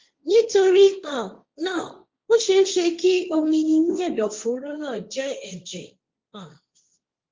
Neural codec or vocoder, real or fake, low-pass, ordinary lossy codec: codec, 16 kHz, 1.1 kbps, Voila-Tokenizer; fake; 7.2 kHz; Opus, 24 kbps